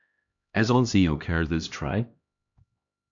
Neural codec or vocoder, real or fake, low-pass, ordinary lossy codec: codec, 16 kHz, 1 kbps, X-Codec, HuBERT features, trained on LibriSpeech; fake; 7.2 kHz; AAC, 64 kbps